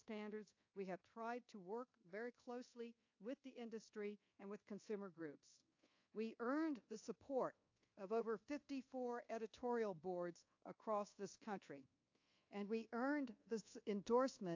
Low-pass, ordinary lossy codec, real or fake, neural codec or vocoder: 7.2 kHz; AAC, 48 kbps; fake; codec, 24 kHz, 1.2 kbps, DualCodec